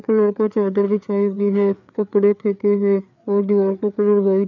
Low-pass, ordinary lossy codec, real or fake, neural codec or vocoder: 7.2 kHz; none; fake; codec, 16 kHz, 4 kbps, FreqCodec, larger model